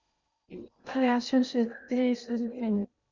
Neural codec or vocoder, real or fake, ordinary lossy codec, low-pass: codec, 16 kHz in and 24 kHz out, 0.6 kbps, FocalCodec, streaming, 2048 codes; fake; Opus, 64 kbps; 7.2 kHz